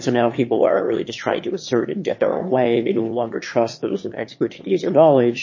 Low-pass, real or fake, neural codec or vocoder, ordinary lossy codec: 7.2 kHz; fake; autoencoder, 22.05 kHz, a latent of 192 numbers a frame, VITS, trained on one speaker; MP3, 32 kbps